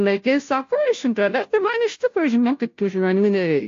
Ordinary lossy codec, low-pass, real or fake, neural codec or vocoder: AAC, 48 kbps; 7.2 kHz; fake; codec, 16 kHz, 0.5 kbps, FunCodec, trained on Chinese and English, 25 frames a second